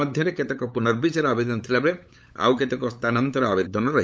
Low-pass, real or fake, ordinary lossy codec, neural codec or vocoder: none; fake; none; codec, 16 kHz, 8 kbps, FunCodec, trained on LibriTTS, 25 frames a second